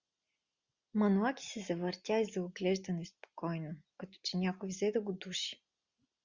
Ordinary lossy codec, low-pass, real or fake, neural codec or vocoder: Opus, 64 kbps; 7.2 kHz; real; none